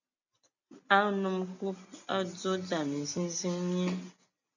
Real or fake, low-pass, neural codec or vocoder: real; 7.2 kHz; none